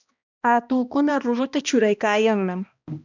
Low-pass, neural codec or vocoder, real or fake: 7.2 kHz; codec, 16 kHz, 1 kbps, X-Codec, HuBERT features, trained on balanced general audio; fake